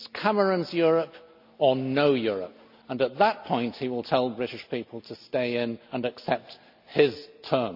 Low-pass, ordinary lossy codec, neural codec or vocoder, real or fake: 5.4 kHz; none; none; real